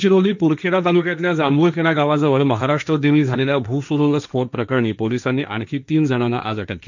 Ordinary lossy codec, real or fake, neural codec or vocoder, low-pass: none; fake; codec, 16 kHz, 1.1 kbps, Voila-Tokenizer; 7.2 kHz